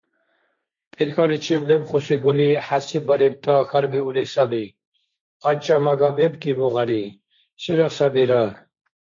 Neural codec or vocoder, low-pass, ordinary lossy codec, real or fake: codec, 16 kHz, 1.1 kbps, Voila-Tokenizer; 7.2 kHz; MP3, 48 kbps; fake